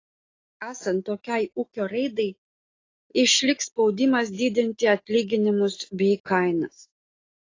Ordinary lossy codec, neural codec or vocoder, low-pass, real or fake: AAC, 32 kbps; none; 7.2 kHz; real